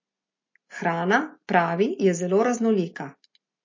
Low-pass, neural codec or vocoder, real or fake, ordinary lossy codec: 7.2 kHz; none; real; MP3, 32 kbps